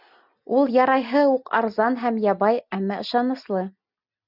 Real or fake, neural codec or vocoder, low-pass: real; none; 5.4 kHz